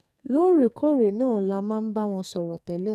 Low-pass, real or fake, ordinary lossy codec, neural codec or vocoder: 14.4 kHz; fake; none; codec, 44.1 kHz, 2.6 kbps, SNAC